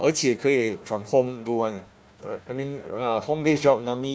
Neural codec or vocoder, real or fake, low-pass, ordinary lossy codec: codec, 16 kHz, 1 kbps, FunCodec, trained on Chinese and English, 50 frames a second; fake; none; none